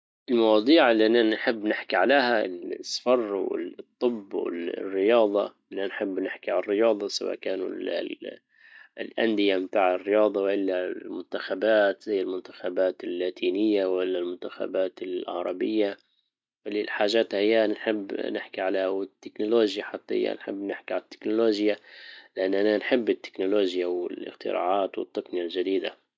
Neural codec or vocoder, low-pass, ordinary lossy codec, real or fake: none; none; none; real